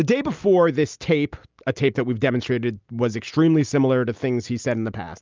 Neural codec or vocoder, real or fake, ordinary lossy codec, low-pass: none; real; Opus, 24 kbps; 7.2 kHz